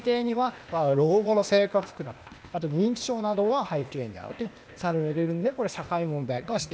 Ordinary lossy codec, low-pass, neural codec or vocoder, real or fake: none; none; codec, 16 kHz, 0.8 kbps, ZipCodec; fake